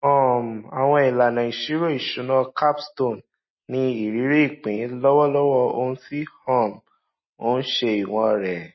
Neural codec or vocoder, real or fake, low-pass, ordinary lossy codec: none; real; 7.2 kHz; MP3, 24 kbps